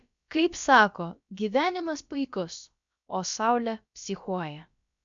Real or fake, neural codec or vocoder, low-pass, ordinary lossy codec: fake; codec, 16 kHz, about 1 kbps, DyCAST, with the encoder's durations; 7.2 kHz; MP3, 64 kbps